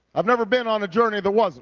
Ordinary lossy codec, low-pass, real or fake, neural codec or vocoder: Opus, 32 kbps; 7.2 kHz; real; none